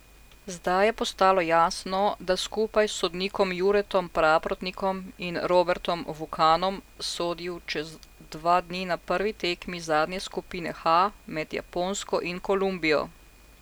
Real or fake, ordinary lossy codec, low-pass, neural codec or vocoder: real; none; none; none